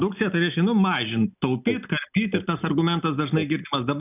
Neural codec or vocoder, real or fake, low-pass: none; real; 3.6 kHz